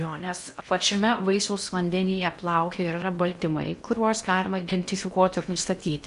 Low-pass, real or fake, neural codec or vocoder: 10.8 kHz; fake; codec, 16 kHz in and 24 kHz out, 0.8 kbps, FocalCodec, streaming, 65536 codes